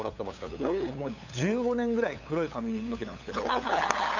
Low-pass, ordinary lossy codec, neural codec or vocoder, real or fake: 7.2 kHz; none; codec, 16 kHz, 8 kbps, FunCodec, trained on LibriTTS, 25 frames a second; fake